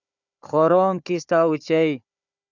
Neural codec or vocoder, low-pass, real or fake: codec, 16 kHz, 4 kbps, FunCodec, trained on Chinese and English, 50 frames a second; 7.2 kHz; fake